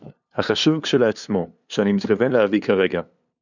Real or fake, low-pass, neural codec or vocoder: fake; 7.2 kHz; codec, 16 kHz, 2 kbps, FunCodec, trained on LibriTTS, 25 frames a second